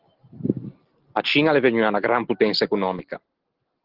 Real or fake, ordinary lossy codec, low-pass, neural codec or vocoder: real; Opus, 16 kbps; 5.4 kHz; none